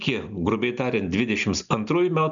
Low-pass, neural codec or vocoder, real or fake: 7.2 kHz; none; real